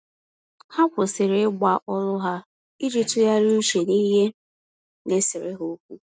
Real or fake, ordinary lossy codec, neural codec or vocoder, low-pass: real; none; none; none